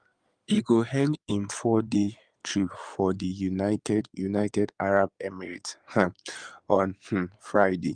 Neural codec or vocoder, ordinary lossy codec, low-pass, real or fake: codec, 16 kHz in and 24 kHz out, 2.2 kbps, FireRedTTS-2 codec; Opus, 32 kbps; 9.9 kHz; fake